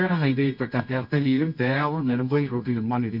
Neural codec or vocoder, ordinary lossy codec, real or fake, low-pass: codec, 24 kHz, 0.9 kbps, WavTokenizer, medium music audio release; none; fake; 5.4 kHz